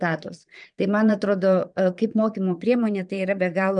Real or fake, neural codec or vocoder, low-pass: fake; vocoder, 22.05 kHz, 80 mel bands, Vocos; 9.9 kHz